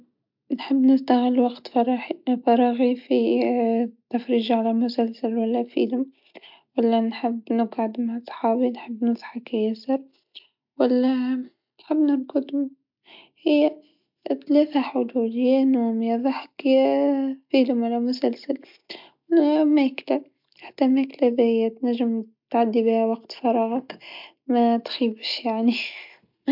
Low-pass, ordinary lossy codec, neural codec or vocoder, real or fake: 5.4 kHz; MP3, 48 kbps; none; real